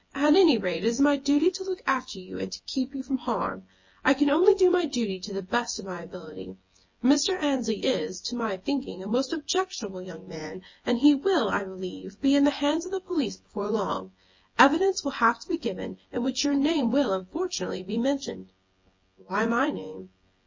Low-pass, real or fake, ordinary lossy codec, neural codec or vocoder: 7.2 kHz; fake; MP3, 32 kbps; vocoder, 24 kHz, 100 mel bands, Vocos